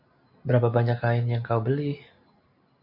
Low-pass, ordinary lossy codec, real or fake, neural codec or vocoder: 5.4 kHz; MP3, 48 kbps; real; none